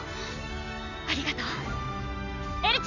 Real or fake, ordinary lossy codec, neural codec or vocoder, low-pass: real; none; none; 7.2 kHz